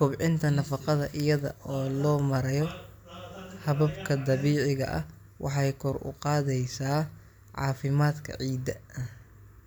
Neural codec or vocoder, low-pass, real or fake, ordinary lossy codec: none; none; real; none